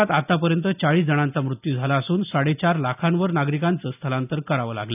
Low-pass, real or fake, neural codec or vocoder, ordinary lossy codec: 3.6 kHz; real; none; none